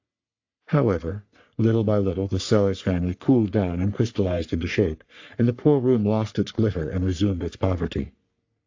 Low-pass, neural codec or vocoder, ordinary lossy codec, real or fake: 7.2 kHz; codec, 44.1 kHz, 3.4 kbps, Pupu-Codec; AAC, 48 kbps; fake